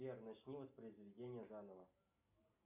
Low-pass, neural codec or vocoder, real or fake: 3.6 kHz; none; real